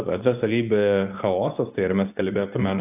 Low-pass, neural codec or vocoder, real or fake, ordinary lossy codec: 3.6 kHz; codec, 24 kHz, 0.9 kbps, WavTokenizer, medium speech release version 2; fake; AAC, 32 kbps